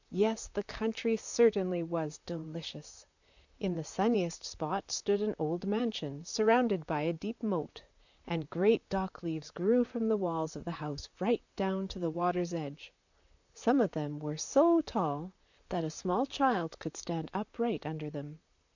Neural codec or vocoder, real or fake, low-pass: vocoder, 44.1 kHz, 128 mel bands, Pupu-Vocoder; fake; 7.2 kHz